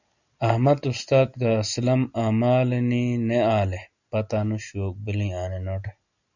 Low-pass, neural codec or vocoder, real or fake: 7.2 kHz; none; real